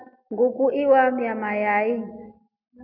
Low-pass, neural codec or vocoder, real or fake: 5.4 kHz; none; real